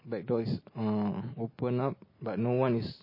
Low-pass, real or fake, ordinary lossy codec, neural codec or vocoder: 5.4 kHz; real; MP3, 24 kbps; none